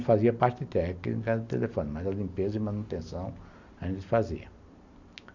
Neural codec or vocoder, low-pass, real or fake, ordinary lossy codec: none; 7.2 kHz; real; none